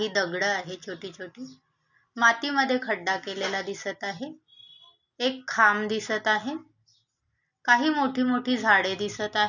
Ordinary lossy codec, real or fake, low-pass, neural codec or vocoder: none; real; 7.2 kHz; none